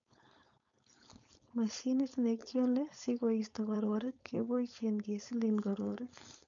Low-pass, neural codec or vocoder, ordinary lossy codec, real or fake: 7.2 kHz; codec, 16 kHz, 4.8 kbps, FACodec; none; fake